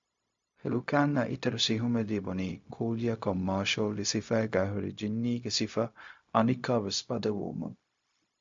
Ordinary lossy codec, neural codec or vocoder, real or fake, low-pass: MP3, 48 kbps; codec, 16 kHz, 0.4 kbps, LongCat-Audio-Codec; fake; 7.2 kHz